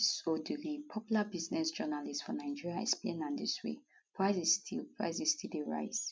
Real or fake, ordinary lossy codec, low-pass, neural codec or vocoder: real; none; none; none